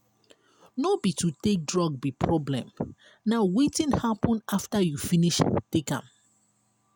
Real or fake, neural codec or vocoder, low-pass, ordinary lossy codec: real; none; none; none